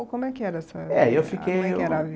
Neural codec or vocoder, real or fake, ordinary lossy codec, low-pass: none; real; none; none